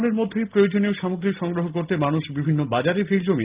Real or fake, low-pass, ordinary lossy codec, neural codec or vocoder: real; 3.6 kHz; Opus, 32 kbps; none